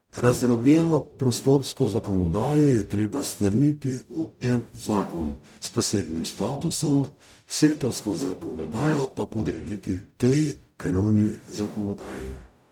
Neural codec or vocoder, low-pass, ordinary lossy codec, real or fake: codec, 44.1 kHz, 0.9 kbps, DAC; 19.8 kHz; none; fake